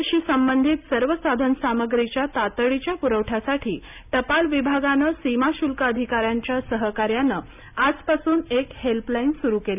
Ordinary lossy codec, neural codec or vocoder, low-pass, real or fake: none; none; 3.6 kHz; real